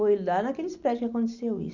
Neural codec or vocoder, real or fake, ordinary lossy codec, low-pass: none; real; none; 7.2 kHz